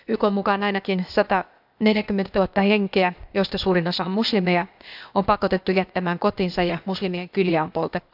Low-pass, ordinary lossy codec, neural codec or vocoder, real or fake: 5.4 kHz; AAC, 48 kbps; codec, 16 kHz, 0.8 kbps, ZipCodec; fake